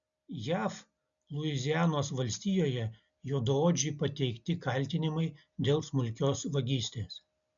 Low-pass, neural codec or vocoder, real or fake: 7.2 kHz; none; real